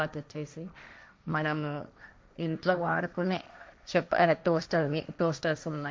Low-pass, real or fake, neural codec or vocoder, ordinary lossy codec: none; fake; codec, 16 kHz, 1.1 kbps, Voila-Tokenizer; none